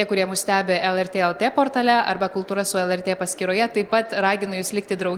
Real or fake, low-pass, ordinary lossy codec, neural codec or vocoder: fake; 19.8 kHz; Opus, 24 kbps; vocoder, 44.1 kHz, 128 mel bands every 256 samples, BigVGAN v2